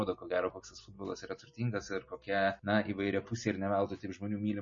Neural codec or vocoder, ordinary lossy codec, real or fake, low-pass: none; MP3, 32 kbps; real; 7.2 kHz